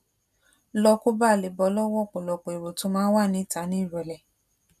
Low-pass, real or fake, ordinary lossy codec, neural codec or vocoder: 14.4 kHz; real; none; none